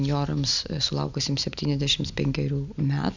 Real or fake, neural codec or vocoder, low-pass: real; none; 7.2 kHz